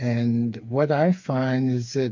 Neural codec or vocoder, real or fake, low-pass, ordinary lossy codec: codec, 16 kHz, 4 kbps, FreqCodec, smaller model; fake; 7.2 kHz; MP3, 48 kbps